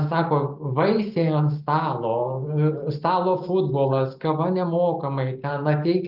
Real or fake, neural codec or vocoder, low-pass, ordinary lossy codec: real; none; 5.4 kHz; Opus, 32 kbps